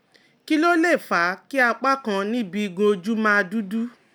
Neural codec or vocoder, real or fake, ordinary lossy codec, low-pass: none; real; none; none